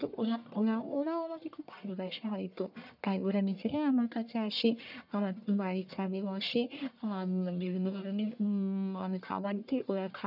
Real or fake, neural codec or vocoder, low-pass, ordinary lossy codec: fake; codec, 44.1 kHz, 1.7 kbps, Pupu-Codec; 5.4 kHz; none